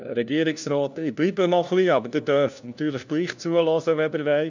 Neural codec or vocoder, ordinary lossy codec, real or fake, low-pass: codec, 16 kHz, 1 kbps, FunCodec, trained on LibriTTS, 50 frames a second; none; fake; 7.2 kHz